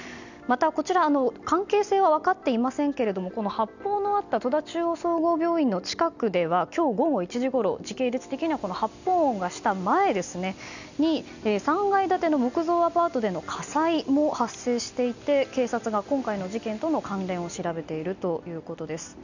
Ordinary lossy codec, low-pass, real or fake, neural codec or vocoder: none; 7.2 kHz; real; none